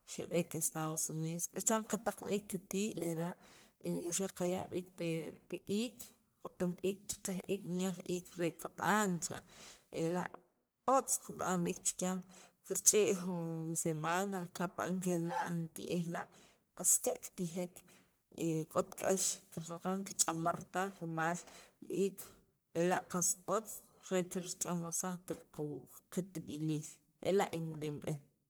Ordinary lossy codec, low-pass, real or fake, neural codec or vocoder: none; none; fake; codec, 44.1 kHz, 1.7 kbps, Pupu-Codec